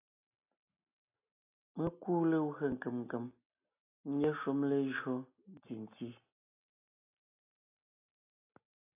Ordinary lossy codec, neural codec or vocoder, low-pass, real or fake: AAC, 24 kbps; none; 3.6 kHz; real